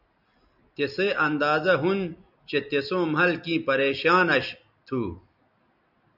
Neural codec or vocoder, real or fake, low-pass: none; real; 5.4 kHz